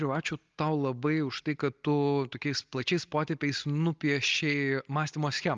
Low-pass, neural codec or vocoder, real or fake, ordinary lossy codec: 7.2 kHz; none; real; Opus, 32 kbps